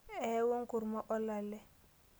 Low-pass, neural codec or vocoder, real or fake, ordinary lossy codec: none; none; real; none